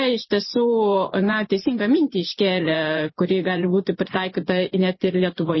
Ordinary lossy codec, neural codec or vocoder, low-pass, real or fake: MP3, 24 kbps; vocoder, 44.1 kHz, 128 mel bands every 512 samples, BigVGAN v2; 7.2 kHz; fake